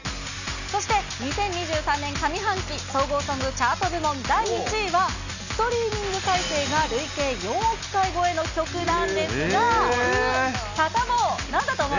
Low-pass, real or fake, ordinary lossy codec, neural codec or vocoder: 7.2 kHz; real; none; none